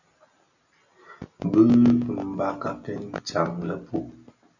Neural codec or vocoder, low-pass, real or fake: none; 7.2 kHz; real